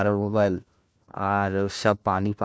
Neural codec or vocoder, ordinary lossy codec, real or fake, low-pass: codec, 16 kHz, 1 kbps, FunCodec, trained on LibriTTS, 50 frames a second; none; fake; none